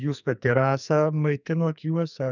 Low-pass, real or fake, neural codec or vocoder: 7.2 kHz; fake; codec, 44.1 kHz, 2.6 kbps, SNAC